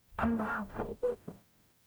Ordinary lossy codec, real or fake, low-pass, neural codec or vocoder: none; fake; none; codec, 44.1 kHz, 0.9 kbps, DAC